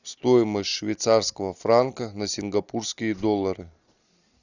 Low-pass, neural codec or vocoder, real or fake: 7.2 kHz; none; real